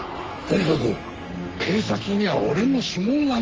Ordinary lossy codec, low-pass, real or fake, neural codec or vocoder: Opus, 24 kbps; 7.2 kHz; fake; codec, 44.1 kHz, 3.4 kbps, Pupu-Codec